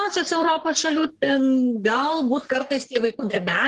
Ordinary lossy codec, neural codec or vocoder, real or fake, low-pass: Opus, 16 kbps; codec, 44.1 kHz, 3.4 kbps, Pupu-Codec; fake; 10.8 kHz